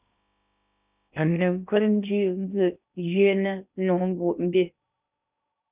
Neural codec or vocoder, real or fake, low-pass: codec, 16 kHz in and 24 kHz out, 0.6 kbps, FocalCodec, streaming, 2048 codes; fake; 3.6 kHz